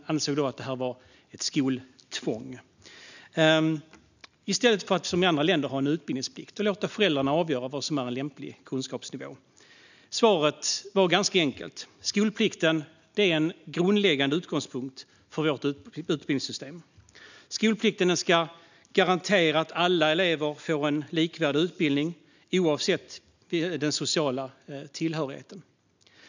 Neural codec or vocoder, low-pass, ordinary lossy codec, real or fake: none; 7.2 kHz; none; real